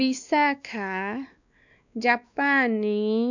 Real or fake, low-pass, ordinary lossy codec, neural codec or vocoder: fake; 7.2 kHz; none; codec, 16 kHz, 2 kbps, X-Codec, WavLM features, trained on Multilingual LibriSpeech